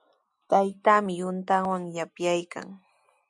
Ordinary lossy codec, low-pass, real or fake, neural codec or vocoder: MP3, 96 kbps; 10.8 kHz; real; none